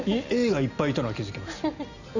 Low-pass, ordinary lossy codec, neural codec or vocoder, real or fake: 7.2 kHz; none; none; real